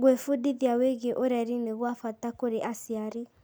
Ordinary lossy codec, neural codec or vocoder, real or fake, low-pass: none; none; real; none